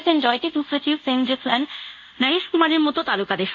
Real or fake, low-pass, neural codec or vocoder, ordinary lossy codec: fake; 7.2 kHz; codec, 24 kHz, 0.5 kbps, DualCodec; none